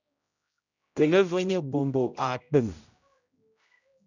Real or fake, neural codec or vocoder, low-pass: fake; codec, 16 kHz, 0.5 kbps, X-Codec, HuBERT features, trained on general audio; 7.2 kHz